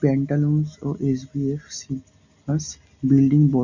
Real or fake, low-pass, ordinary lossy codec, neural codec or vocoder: real; 7.2 kHz; none; none